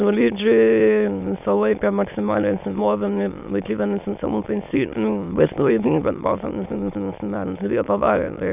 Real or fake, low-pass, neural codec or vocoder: fake; 3.6 kHz; autoencoder, 22.05 kHz, a latent of 192 numbers a frame, VITS, trained on many speakers